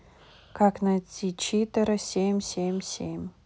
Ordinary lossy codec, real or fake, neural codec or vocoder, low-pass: none; real; none; none